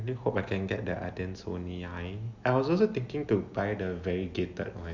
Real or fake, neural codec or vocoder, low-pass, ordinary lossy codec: real; none; 7.2 kHz; Opus, 64 kbps